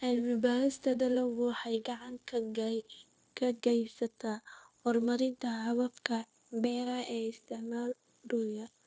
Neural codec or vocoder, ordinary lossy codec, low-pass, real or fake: codec, 16 kHz, 0.9 kbps, LongCat-Audio-Codec; none; none; fake